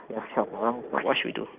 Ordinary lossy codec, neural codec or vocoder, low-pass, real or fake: Opus, 24 kbps; none; 3.6 kHz; real